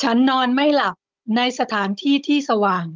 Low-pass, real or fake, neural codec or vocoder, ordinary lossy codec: 7.2 kHz; fake; codec, 16 kHz, 16 kbps, FunCodec, trained on Chinese and English, 50 frames a second; Opus, 32 kbps